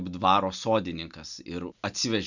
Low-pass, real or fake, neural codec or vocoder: 7.2 kHz; real; none